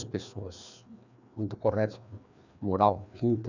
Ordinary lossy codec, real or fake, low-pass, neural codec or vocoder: none; fake; 7.2 kHz; codec, 16 kHz, 2 kbps, FreqCodec, larger model